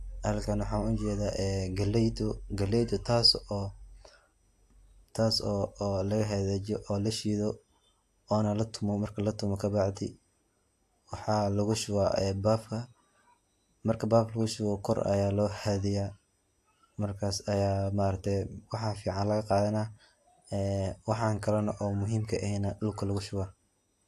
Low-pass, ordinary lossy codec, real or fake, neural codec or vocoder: 14.4 kHz; AAC, 64 kbps; real; none